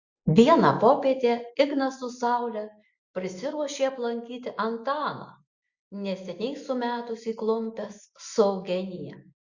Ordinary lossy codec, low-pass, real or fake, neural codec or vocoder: Opus, 64 kbps; 7.2 kHz; real; none